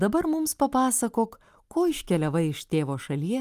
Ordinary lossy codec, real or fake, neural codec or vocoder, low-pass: Opus, 32 kbps; real; none; 14.4 kHz